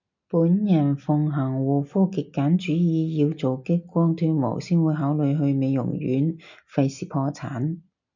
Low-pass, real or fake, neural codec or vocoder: 7.2 kHz; real; none